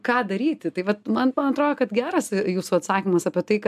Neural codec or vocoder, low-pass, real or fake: none; 14.4 kHz; real